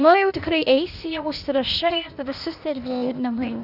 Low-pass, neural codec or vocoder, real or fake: 5.4 kHz; codec, 16 kHz, 0.8 kbps, ZipCodec; fake